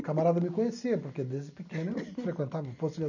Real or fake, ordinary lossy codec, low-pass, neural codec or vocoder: real; none; 7.2 kHz; none